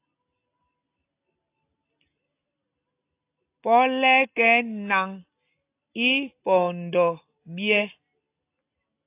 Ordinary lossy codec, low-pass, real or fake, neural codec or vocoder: AAC, 24 kbps; 3.6 kHz; real; none